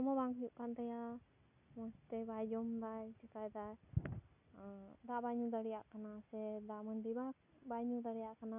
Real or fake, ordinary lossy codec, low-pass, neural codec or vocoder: real; none; 3.6 kHz; none